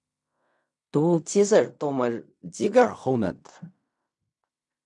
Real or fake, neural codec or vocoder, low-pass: fake; codec, 16 kHz in and 24 kHz out, 0.4 kbps, LongCat-Audio-Codec, fine tuned four codebook decoder; 10.8 kHz